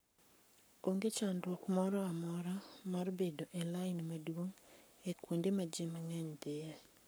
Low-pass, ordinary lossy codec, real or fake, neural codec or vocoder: none; none; fake; codec, 44.1 kHz, 7.8 kbps, Pupu-Codec